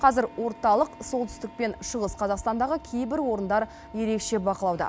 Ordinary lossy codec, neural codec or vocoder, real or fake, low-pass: none; none; real; none